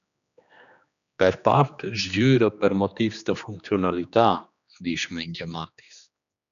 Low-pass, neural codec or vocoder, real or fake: 7.2 kHz; codec, 16 kHz, 2 kbps, X-Codec, HuBERT features, trained on general audio; fake